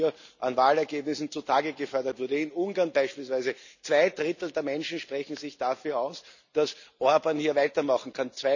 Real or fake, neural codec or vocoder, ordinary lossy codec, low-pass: real; none; none; 7.2 kHz